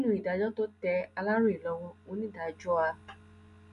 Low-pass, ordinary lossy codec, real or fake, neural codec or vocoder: 10.8 kHz; none; real; none